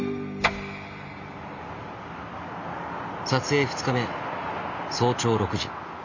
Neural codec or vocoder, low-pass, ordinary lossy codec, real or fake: none; 7.2 kHz; Opus, 64 kbps; real